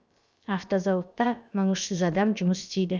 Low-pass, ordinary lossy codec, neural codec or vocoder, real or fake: none; none; codec, 16 kHz, about 1 kbps, DyCAST, with the encoder's durations; fake